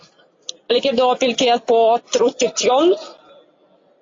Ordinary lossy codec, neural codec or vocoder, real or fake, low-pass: AAC, 32 kbps; none; real; 7.2 kHz